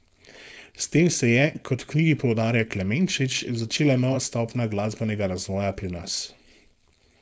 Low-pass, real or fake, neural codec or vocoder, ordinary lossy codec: none; fake; codec, 16 kHz, 4.8 kbps, FACodec; none